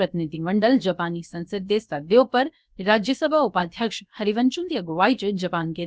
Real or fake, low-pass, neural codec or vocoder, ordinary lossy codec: fake; none; codec, 16 kHz, about 1 kbps, DyCAST, with the encoder's durations; none